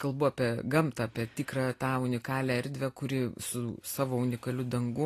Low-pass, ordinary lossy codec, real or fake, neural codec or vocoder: 14.4 kHz; AAC, 48 kbps; real; none